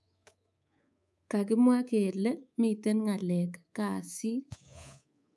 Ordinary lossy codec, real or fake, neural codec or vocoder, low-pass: none; fake; codec, 24 kHz, 3.1 kbps, DualCodec; none